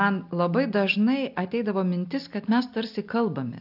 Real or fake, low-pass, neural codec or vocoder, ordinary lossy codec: real; 5.4 kHz; none; AAC, 48 kbps